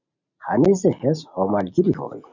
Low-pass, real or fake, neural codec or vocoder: 7.2 kHz; real; none